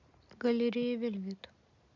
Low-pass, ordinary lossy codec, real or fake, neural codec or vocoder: 7.2 kHz; none; real; none